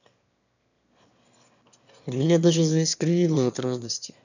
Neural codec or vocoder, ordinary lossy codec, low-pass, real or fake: autoencoder, 22.05 kHz, a latent of 192 numbers a frame, VITS, trained on one speaker; none; 7.2 kHz; fake